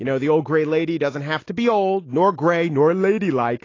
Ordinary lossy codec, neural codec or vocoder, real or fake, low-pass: AAC, 32 kbps; none; real; 7.2 kHz